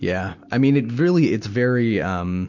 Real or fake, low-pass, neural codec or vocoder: real; 7.2 kHz; none